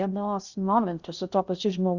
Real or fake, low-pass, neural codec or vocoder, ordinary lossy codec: fake; 7.2 kHz; codec, 16 kHz in and 24 kHz out, 0.6 kbps, FocalCodec, streaming, 2048 codes; Opus, 64 kbps